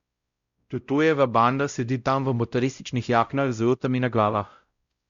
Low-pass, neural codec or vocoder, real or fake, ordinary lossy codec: 7.2 kHz; codec, 16 kHz, 0.5 kbps, X-Codec, WavLM features, trained on Multilingual LibriSpeech; fake; Opus, 64 kbps